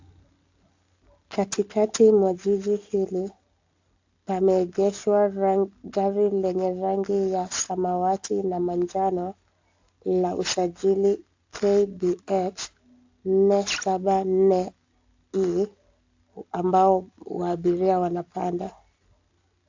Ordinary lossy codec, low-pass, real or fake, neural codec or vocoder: AAC, 48 kbps; 7.2 kHz; real; none